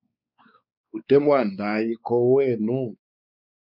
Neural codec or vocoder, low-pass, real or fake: codec, 16 kHz, 4 kbps, X-Codec, WavLM features, trained on Multilingual LibriSpeech; 5.4 kHz; fake